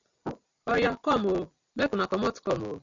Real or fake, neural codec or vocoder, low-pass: real; none; 7.2 kHz